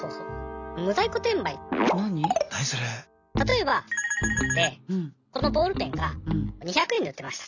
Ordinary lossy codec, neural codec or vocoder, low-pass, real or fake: none; none; 7.2 kHz; real